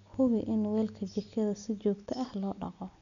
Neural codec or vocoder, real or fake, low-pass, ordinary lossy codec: none; real; 7.2 kHz; MP3, 96 kbps